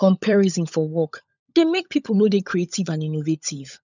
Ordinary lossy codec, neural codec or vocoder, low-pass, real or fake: none; codec, 16 kHz, 16 kbps, FunCodec, trained on LibriTTS, 50 frames a second; 7.2 kHz; fake